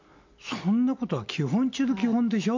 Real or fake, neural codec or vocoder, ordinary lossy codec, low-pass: real; none; MP3, 48 kbps; 7.2 kHz